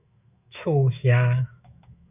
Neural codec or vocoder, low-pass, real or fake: autoencoder, 48 kHz, 128 numbers a frame, DAC-VAE, trained on Japanese speech; 3.6 kHz; fake